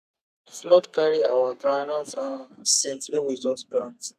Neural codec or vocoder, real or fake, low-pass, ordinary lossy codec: codec, 32 kHz, 1.9 kbps, SNAC; fake; 14.4 kHz; none